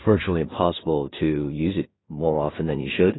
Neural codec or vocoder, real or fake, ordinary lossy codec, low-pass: codec, 16 kHz in and 24 kHz out, 0.4 kbps, LongCat-Audio-Codec, two codebook decoder; fake; AAC, 16 kbps; 7.2 kHz